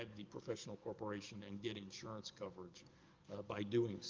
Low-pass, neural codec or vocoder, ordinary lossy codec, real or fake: 7.2 kHz; codec, 44.1 kHz, 7.8 kbps, DAC; Opus, 24 kbps; fake